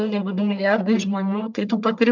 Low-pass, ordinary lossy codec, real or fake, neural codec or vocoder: 7.2 kHz; MP3, 64 kbps; fake; codec, 44.1 kHz, 1.7 kbps, Pupu-Codec